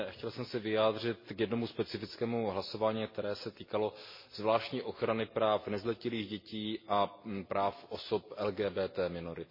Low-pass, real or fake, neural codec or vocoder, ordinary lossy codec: 5.4 kHz; real; none; MP3, 24 kbps